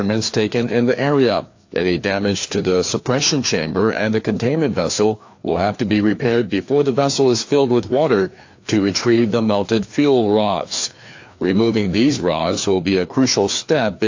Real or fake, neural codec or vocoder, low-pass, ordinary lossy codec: fake; codec, 16 kHz, 2 kbps, FreqCodec, larger model; 7.2 kHz; AAC, 48 kbps